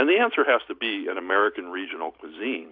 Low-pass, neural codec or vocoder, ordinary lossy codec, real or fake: 5.4 kHz; none; AAC, 48 kbps; real